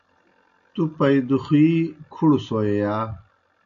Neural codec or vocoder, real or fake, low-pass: none; real; 7.2 kHz